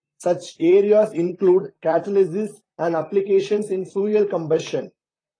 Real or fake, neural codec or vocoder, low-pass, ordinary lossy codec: fake; vocoder, 44.1 kHz, 128 mel bands, Pupu-Vocoder; 9.9 kHz; AAC, 32 kbps